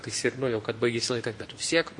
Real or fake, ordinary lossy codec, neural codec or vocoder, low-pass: real; MP3, 48 kbps; none; 10.8 kHz